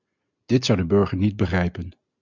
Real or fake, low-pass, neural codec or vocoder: real; 7.2 kHz; none